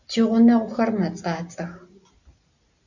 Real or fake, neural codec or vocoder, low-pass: real; none; 7.2 kHz